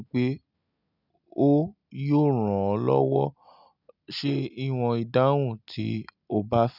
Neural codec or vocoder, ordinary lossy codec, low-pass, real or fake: none; none; 5.4 kHz; real